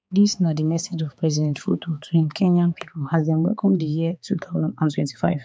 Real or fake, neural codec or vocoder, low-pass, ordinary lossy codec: fake; codec, 16 kHz, 4 kbps, X-Codec, HuBERT features, trained on balanced general audio; none; none